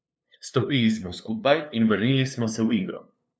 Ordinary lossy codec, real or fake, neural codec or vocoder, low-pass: none; fake; codec, 16 kHz, 2 kbps, FunCodec, trained on LibriTTS, 25 frames a second; none